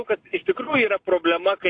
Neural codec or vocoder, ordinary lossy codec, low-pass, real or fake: none; AAC, 48 kbps; 9.9 kHz; real